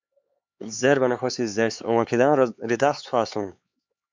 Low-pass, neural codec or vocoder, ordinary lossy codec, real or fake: 7.2 kHz; codec, 16 kHz, 4 kbps, X-Codec, HuBERT features, trained on LibriSpeech; MP3, 64 kbps; fake